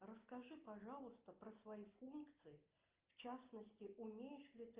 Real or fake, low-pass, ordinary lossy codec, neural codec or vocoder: real; 3.6 kHz; Opus, 32 kbps; none